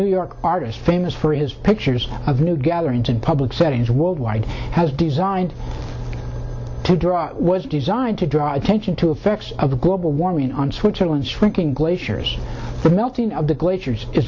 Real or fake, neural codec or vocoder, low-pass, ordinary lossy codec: real; none; 7.2 kHz; MP3, 64 kbps